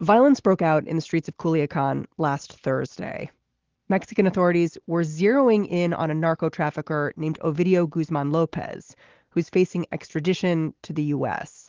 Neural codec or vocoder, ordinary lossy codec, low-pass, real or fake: none; Opus, 16 kbps; 7.2 kHz; real